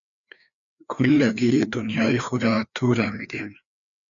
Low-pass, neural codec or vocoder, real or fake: 7.2 kHz; codec, 16 kHz, 2 kbps, FreqCodec, larger model; fake